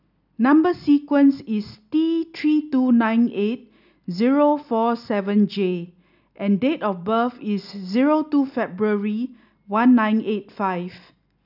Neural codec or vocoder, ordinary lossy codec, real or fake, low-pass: none; none; real; 5.4 kHz